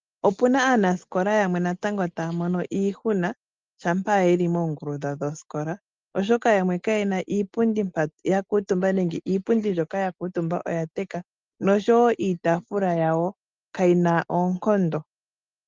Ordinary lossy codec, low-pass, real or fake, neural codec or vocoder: Opus, 32 kbps; 7.2 kHz; real; none